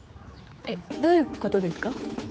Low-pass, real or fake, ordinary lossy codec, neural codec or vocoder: none; fake; none; codec, 16 kHz, 4 kbps, X-Codec, HuBERT features, trained on general audio